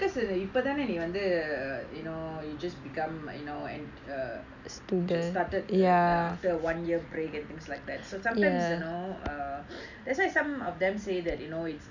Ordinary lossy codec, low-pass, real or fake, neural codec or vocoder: none; 7.2 kHz; real; none